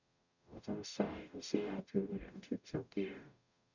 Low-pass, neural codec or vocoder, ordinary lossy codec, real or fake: 7.2 kHz; codec, 44.1 kHz, 0.9 kbps, DAC; none; fake